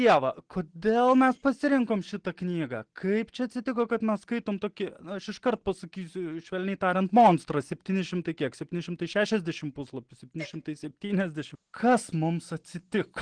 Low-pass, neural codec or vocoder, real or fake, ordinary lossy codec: 9.9 kHz; none; real; Opus, 16 kbps